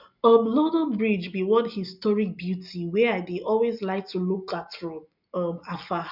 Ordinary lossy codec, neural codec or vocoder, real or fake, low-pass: none; none; real; 5.4 kHz